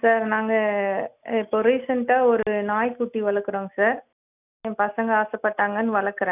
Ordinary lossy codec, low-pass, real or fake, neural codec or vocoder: none; 3.6 kHz; real; none